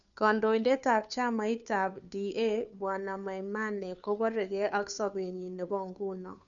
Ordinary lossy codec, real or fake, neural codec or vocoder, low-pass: none; fake; codec, 16 kHz, 2 kbps, FunCodec, trained on Chinese and English, 25 frames a second; 7.2 kHz